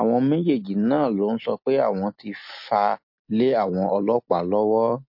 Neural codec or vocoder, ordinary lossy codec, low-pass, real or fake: none; MP3, 32 kbps; 5.4 kHz; real